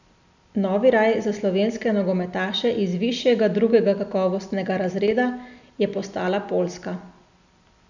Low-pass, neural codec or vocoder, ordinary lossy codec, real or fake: 7.2 kHz; none; none; real